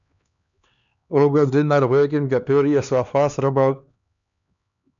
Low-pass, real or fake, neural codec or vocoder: 7.2 kHz; fake; codec, 16 kHz, 2 kbps, X-Codec, HuBERT features, trained on LibriSpeech